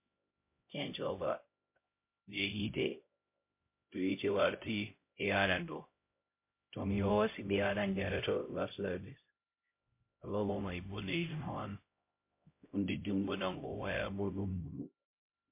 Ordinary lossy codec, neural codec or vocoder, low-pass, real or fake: MP3, 24 kbps; codec, 16 kHz, 0.5 kbps, X-Codec, HuBERT features, trained on LibriSpeech; 3.6 kHz; fake